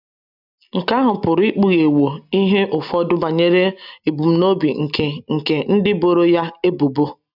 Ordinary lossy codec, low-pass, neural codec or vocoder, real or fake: none; 5.4 kHz; none; real